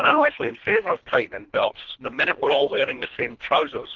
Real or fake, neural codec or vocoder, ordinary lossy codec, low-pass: fake; codec, 24 kHz, 1.5 kbps, HILCodec; Opus, 16 kbps; 7.2 kHz